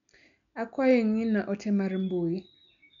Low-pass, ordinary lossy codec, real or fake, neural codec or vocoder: 7.2 kHz; none; real; none